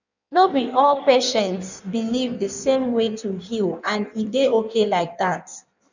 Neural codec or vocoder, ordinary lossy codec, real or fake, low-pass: codec, 16 kHz in and 24 kHz out, 1.1 kbps, FireRedTTS-2 codec; none; fake; 7.2 kHz